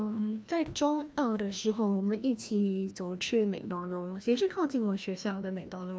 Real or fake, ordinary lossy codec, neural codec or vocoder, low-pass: fake; none; codec, 16 kHz, 1 kbps, FreqCodec, larger model; none